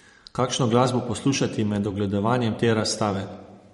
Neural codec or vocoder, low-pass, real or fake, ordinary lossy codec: vocoder, 44.1 kHz, 128 mel bands every 512 samples, BigVGAN v2; 19.8 kHz; fake; MP3, 48 kbps